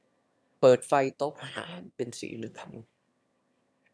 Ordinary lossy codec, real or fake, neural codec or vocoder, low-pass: none; fake; autoencoder, 22.05 kHz, a latent of 192 numbers a frame, VITS, trained on one speaker; none